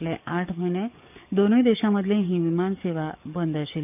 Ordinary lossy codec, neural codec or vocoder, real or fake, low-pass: none; codec, 44.1 kHz, 7.8 kbps, DAC; fake; 3.6 kHz